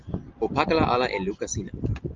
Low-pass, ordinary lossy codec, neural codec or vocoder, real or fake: 7.2 kHz; Opus, 24 kbps; none; real